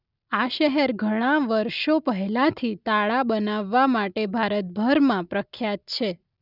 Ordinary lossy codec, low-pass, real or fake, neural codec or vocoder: none; 5.4 kHz; real; none